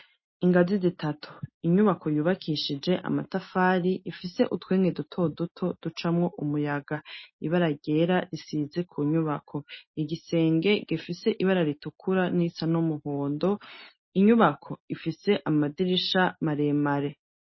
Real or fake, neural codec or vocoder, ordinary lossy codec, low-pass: real; none; MP3, 24 kbps; 7.2 kHz